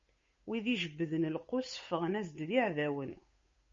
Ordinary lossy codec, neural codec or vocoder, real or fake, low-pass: MP3, 32 kbps; codec, 16 kHz, 8 kbps, FunCodec, trained on Chinese and English, 25 frames a second; fake; 7.2 kHz